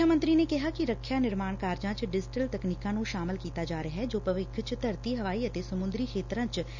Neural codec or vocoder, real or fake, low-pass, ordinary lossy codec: none; real; 7.2 kHz; none